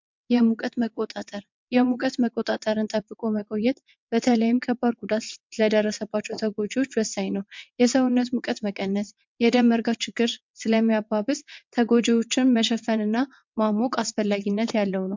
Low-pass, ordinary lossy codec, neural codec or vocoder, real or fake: 7.2 kHz; MP3, 64 kbps; vocoder, 22.05 kHz, 80 mel bands, WaveNeXt; fake